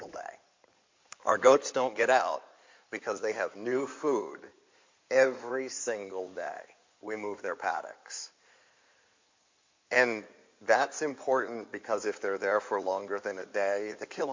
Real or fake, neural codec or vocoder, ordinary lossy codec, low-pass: fake; codec, 16 kHz in and 24 kHz out, 2.2 kbps, FireRedTTS-2 codec; MP3, 48 kbps; 7.2 kHz